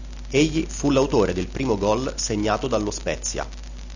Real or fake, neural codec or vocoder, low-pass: real; none; 7.2 kHz